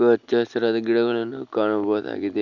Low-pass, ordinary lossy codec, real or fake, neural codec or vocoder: 7.2 kHz; none; real; none